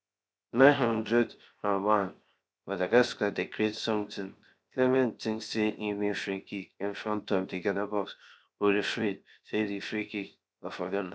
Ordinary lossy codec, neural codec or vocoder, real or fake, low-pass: none; codec, 16 kHz, 0.7 kbps, FocalCodec; fake; none